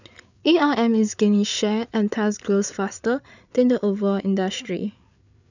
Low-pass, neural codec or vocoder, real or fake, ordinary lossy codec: 7.2 kHz; codec, 16 kHz, 8 kbps, FreqCodec, larger model; fake; none